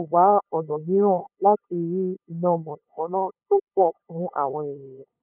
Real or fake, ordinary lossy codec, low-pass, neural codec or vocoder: fake; none; 3.6 kHz; codec, 16 kHz, 8 kbps, FunCodec, trained on LibriTTS, 25 frames a second